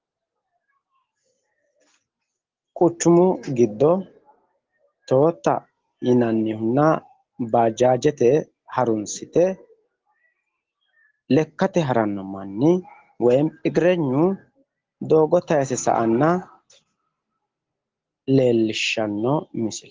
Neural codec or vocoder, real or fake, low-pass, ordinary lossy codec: none; real; 7.2 kHz; Opus, 16 kbps